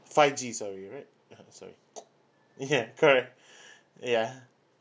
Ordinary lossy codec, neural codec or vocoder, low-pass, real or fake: none; none; none; real